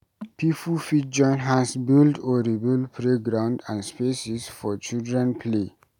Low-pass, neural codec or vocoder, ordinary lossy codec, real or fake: 19.8 kHz; none; none; real